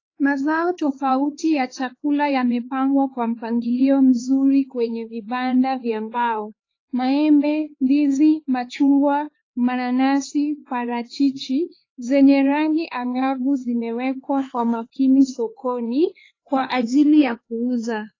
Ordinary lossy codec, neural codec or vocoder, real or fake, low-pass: AAC, 32 kbps; codec, 16 kHz, 4 kbps, X-Codec, HuBERT features, trained on LibriSpeech; fake; 7.2 kHz